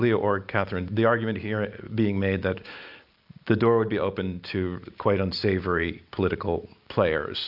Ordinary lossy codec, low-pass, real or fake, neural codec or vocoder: AAC, 48 kbps; 5.4 kHz; real; none